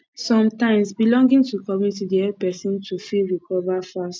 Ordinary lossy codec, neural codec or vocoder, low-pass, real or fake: none; none; none; real